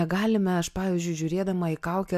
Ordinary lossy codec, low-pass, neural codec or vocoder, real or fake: MP3, 96 kbps; 14.4 kHz; none; real